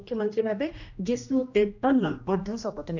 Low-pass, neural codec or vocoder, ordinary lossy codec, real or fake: 7.2 kHz; codec, 16 kHz, 1 kbps, X-Codec, HuBERT features, trained on general audio; none; fake